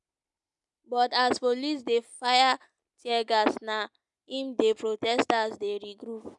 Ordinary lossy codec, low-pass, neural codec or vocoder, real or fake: none; 10.8 kHz; none; real